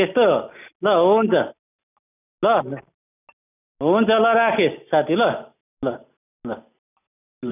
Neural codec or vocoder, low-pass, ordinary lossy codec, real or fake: none; 3.6 kHz; none; real